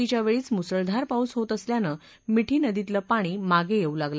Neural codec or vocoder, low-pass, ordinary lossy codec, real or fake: none; none; none; real